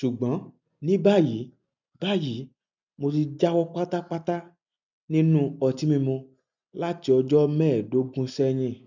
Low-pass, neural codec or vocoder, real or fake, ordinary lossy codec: 7.2 kHz; none; real; none